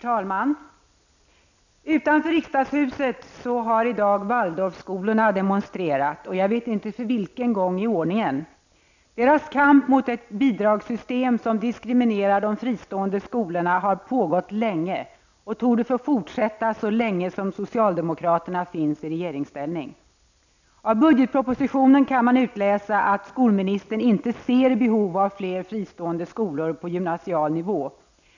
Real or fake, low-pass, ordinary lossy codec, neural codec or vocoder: real; 7.2 kHz; none; none